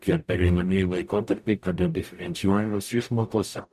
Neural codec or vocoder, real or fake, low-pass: codec, 44.1 kHz, 0.9 kbps, DAC; fake; 14.4 kHz